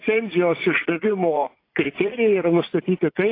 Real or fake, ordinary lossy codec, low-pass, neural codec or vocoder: fake; AAC, 24 kbps; 5.4 kHz; vocoder, 44.1 kHz, 80 mel bands, Vocos